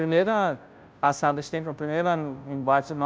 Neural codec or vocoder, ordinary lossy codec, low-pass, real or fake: codec, 16 kHz, 0.5 kbps, FunCodec, trained on Chinese and English, 25 frames a second; none; none; fake